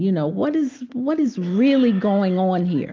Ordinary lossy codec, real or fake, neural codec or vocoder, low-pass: Opus, 32 kbps; real; none; 7.2 kHz